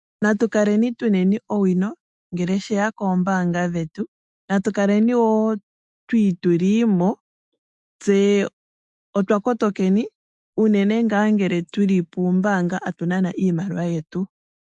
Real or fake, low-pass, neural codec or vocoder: fake; 10.8 kHz; autoencoder, 48 kHz, 128 numbers a frame, DAC-VAE, trained on Japanese speech